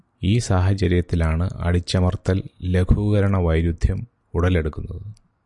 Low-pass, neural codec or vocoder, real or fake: 10.8 kHz; none; real